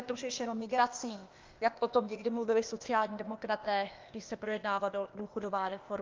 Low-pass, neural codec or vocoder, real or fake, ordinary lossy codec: 7.2 kHz; codec, 16 kHz, 0.8 kbps, ZipCodec; fake; Opus, 24 kbps